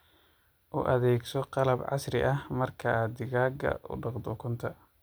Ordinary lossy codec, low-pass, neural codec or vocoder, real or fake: none; none; none; real